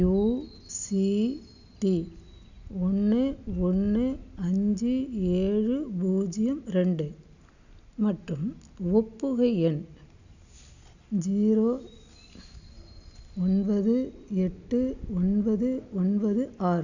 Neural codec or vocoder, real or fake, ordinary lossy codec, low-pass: none; real; none; 7.2 kHz